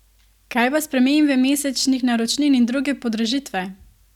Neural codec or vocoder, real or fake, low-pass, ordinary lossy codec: none; real; 19.8 kHz; none